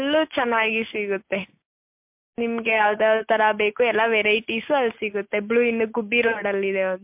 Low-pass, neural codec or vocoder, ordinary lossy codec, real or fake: 3.6 kHz; none; MP3, 32 kbps; real